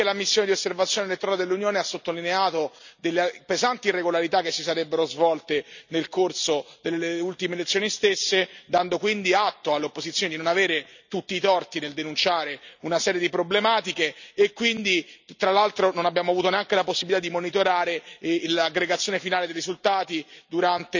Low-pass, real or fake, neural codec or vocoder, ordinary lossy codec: 7.2 kHz; real; none; none